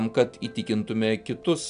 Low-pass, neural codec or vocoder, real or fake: 9.9 kHz; none; real